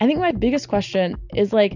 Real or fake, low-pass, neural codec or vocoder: real; 7.2 kHz; none